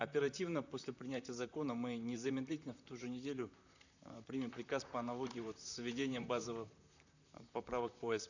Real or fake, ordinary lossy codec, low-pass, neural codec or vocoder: fake; none; 7.2 kHz; vocoder, 44.1 kHz, 128 mel bands, Pupu-Vocoder